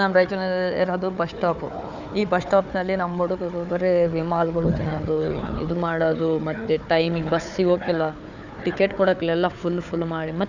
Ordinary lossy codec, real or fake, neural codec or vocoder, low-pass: none; fake; codec, 16 kHz, 4 kbps, FunCodec, trained on Chinese and English, 50 frames a second; 7.2 kHz